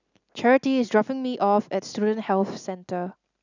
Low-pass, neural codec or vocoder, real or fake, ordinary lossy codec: 7.2 kHz; none; real; none